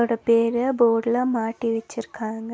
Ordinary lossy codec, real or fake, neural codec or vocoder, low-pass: none; real; none; none